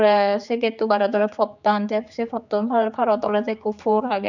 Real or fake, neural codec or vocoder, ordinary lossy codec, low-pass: fake; codec, 16 kHz, 4 kbps, X-Codec, HuBERT features, trained on general audio; none; 7.2 kHz